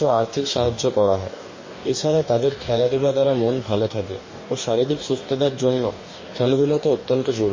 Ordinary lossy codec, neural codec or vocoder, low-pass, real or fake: MP3, 32 kbps; codec, 44.1 kHz, 2.6 kbps, DAC; 7.2 kHz; fake